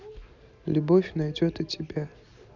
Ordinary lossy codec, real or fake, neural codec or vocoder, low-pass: Opus, 64 kbps; real; none; 7.2 kHz